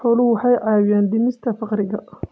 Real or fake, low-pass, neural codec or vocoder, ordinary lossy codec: real; none; none; none